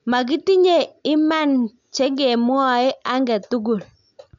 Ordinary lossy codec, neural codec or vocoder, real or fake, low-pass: MP3, 96 kbps; none; real; 7.2 kHz